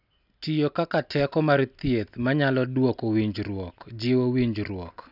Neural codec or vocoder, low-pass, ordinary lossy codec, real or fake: none; 5.4 kHz; none; real